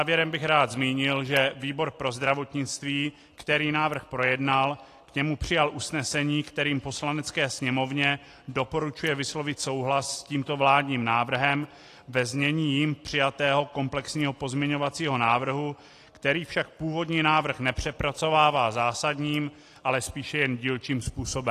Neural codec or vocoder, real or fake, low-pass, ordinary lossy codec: none; real; 14.4 kHz; AAC, 48 kbps